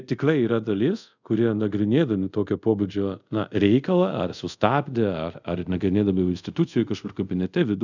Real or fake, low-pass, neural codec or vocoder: fake; 7.2 kHz; codec, 24 kHz, 0.5 kbps, DualCodec